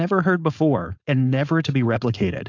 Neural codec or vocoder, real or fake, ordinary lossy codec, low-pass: codec, 16 kHz, 4.8 kbps, FACodec; fake; AAC, 48 kbps; 7.2 kHz